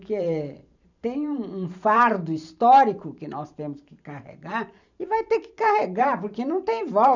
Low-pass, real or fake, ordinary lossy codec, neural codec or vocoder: 7.2 kHz; fake; none; vocoder, 44.1 kHz, 128 mel bands every 512 samples, BigVGAN v2